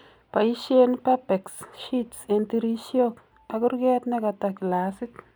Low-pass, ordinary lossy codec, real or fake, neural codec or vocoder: none; none; real; none